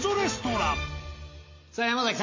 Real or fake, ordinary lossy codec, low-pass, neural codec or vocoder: real; MP3, 32 kbps; 7.2 kHz; none